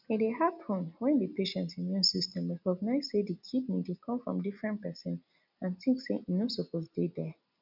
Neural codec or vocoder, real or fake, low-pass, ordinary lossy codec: none; real; 5.4 kHz; none